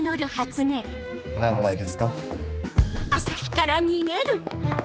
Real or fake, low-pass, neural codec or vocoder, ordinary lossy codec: fake; none; codec, 16 kHz, 2 kbps, X-Codec, HuBERT features, trained on general audio; none